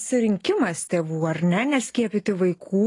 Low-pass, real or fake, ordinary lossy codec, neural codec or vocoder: 10.8 kHz; real; AAC, 32 kbps; none